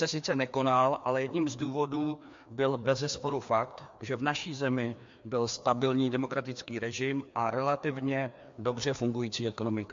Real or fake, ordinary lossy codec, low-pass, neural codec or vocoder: fake; MP3, 48 kbps; 7.2 kHz; codec, 16 kHz, 2 kbps, FreqCodec, larger model